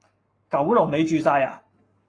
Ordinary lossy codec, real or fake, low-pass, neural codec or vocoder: AAC, 32 kbps; fake; 9.9 kHz; codec, 44.1 kHz, 7.8 kbps, Pupu-Codec